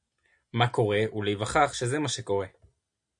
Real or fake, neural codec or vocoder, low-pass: real; none; 9.9 kHz